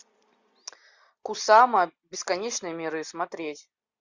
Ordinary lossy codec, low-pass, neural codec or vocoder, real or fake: Opus, 64 kbps; 7.2 kHz; none; real